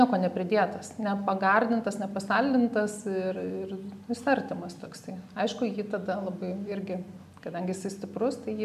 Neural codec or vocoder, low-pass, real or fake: none; 14.4 kHz; real